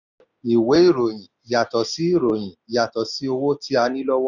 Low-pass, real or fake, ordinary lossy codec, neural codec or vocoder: 7.2 kHz; real; none; none